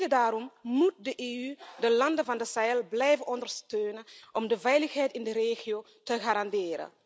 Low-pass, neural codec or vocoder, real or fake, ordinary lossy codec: none; none; real; none